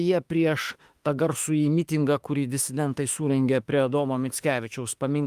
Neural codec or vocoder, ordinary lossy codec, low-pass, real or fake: autoencoder, 48 kHz, 32 numbers a frame, DAC-VAE, trained on Japanese speech; Opus, 32 kbps; 14.4 kHz; fake